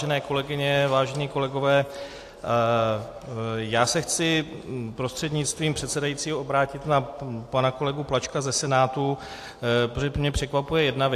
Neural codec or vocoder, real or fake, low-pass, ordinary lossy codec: none; real; 14.4 kHz; AAC, 64 kbps